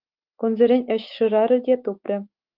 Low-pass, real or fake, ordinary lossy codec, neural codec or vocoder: 5.4 kHz; fake; Opus, 24 kbps; autoencoder, 48 kHz, 128 numbers a frame, DAC-VAE, trained on Japanese speech